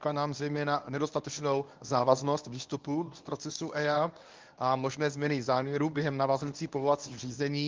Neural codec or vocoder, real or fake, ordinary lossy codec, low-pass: codec, 24 kHz, 0.9 kbps, WavTokenizer, medium speech release version 1; fake; Opus, 32 kbps; 7.2 kHz